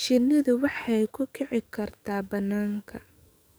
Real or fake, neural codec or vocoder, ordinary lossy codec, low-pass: fake; codec, 44.1 kHz, 7.8 kbps, DAC; none; none